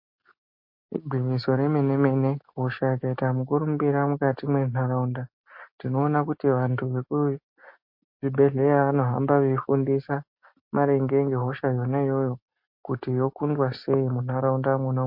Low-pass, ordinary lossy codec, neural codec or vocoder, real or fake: 5.4 kHz; MP3, 32 kbps; none; real